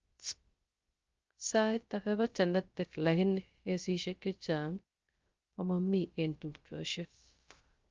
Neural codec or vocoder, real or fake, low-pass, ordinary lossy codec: codec, 16 kHz, 0.3 kbps, FocalCodec; fake; 7.2 kHz; Opus, 24 kbps